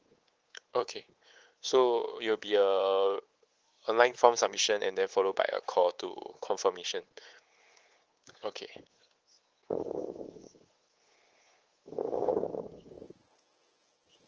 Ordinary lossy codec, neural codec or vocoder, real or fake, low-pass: Opus, 16 kbps; codec, 24 kHz, 3.1 kbps, DualCodec; fake; 7.2 kHz